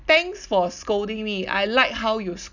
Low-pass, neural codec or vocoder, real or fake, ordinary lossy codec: 7.2 kHz; none; real; none